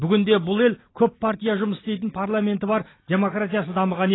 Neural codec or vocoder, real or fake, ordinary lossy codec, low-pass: none; real; AAC, 16 kbps; 7.2 kHz